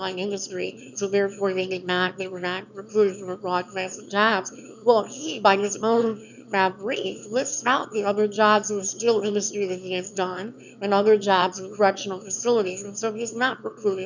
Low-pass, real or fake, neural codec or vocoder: 7.2 kHz; fake; autoencoder, 22.05 kHz, a latent of 192 numbers a frame, VITS, trained on one speaker